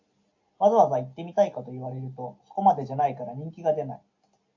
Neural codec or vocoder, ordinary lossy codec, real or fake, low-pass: none; AAC, 48 kbps; real; 7.2 kHz